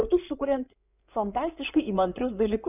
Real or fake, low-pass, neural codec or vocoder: fake; 3.6 kHz; codec, 16 kHz in and 24 kHz out, 2.2 kbps, FireRedTTS-2 codec